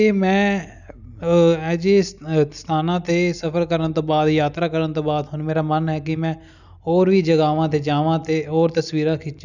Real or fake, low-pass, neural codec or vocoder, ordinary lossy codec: real; 7.2 kHz; none; none